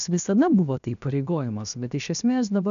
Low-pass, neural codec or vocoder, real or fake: 7.2 kHz; codec, 16 kHz, 0.7 kbps, FocalCodec; fake